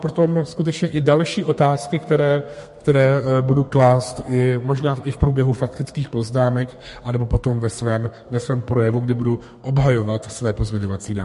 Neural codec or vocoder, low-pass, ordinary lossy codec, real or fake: codec, 32 kHz, 1.9 kbps, SNAC; 14.4 kHz; MP3, 48 kbps; fake